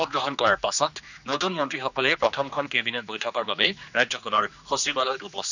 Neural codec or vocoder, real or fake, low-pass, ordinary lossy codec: codec, 16 kHz, 2 kbps, X-Codec, HuBERT features, trained on general audio; fake; 7.2 kHz; none